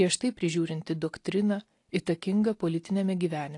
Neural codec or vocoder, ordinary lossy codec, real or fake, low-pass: none; AAC, 48 kbps; real; 10.8 kHz